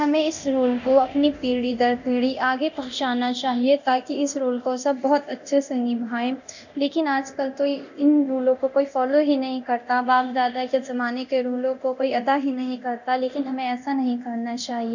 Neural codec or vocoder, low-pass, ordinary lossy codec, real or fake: codec, 24 kHz, 0.9 kbps, DualCodec; 7.2 kHz; none; fake